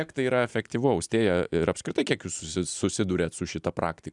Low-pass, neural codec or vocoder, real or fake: 10.8 kHz; vocoder, 44.1 kHz, 128 mel bands every 256 samples, BigVGAN v2; fake